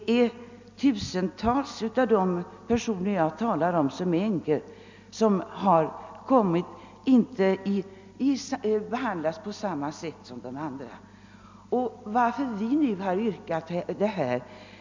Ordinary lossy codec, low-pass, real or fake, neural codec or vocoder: MP3, 64 kbps; 7.2 kHz; real; none